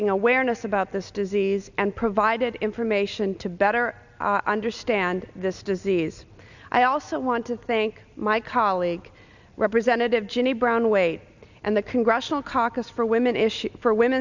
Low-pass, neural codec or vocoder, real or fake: 7.2 kHz; none; real